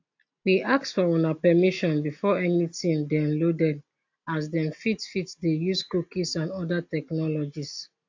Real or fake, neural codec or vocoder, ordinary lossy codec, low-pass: real; none; AAC, 48 kbps; 7.2 kHz